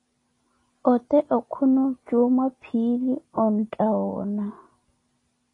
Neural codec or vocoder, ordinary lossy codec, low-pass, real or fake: none; AAC, 32 kbps; 10.8 kHz; real